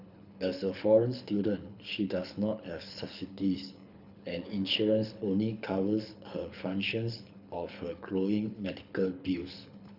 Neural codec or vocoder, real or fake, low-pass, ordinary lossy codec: codec, 24 kHz, 6 kbps, HILCodec; fake; 5.4 kHz; none